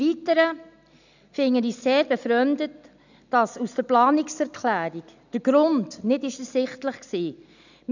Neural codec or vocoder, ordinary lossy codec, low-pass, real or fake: none; none; 7.2 kHz; real